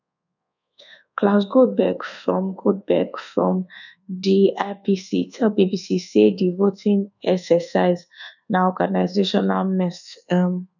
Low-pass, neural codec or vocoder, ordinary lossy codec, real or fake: 7.2 kHz; codec, 24 kHz, 1.2 kbps, DualCodec; none; fake